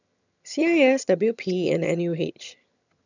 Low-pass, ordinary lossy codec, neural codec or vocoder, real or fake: 7.2 kHz; none; vocoder, 22.05 kHz, 80 mel bands, HiFi-GAN; fake